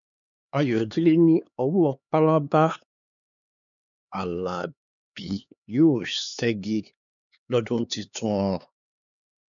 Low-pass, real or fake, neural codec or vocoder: 7.2 kHz; fake; codec, 16 kHz, 4 kbps, X-Codec, HuBERT features, trained on LibriSpeech